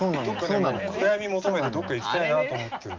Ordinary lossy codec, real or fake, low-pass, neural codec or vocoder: Opus, 24 kbps; real; 7.2 kHz; none